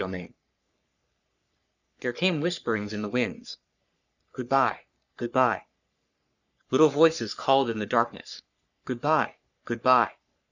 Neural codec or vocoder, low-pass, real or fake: codec, 44.1 kHz, 3.4 kbps, Pupu-Codec; 7.2 kHz; fake